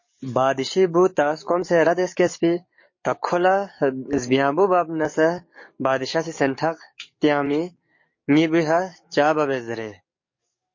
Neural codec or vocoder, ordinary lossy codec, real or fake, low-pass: codec, 44.1 kHz, 7.8 kbps, DAC; MP3, 32 kbps; fake; 7.2 kHz